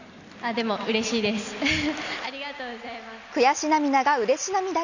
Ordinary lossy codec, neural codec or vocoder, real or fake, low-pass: none; none; real; 7.2 kHz